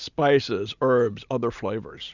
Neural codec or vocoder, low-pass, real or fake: none; 7.2 kHz; real